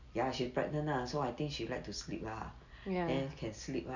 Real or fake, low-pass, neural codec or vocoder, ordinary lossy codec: real; 7.2 kHz; none; none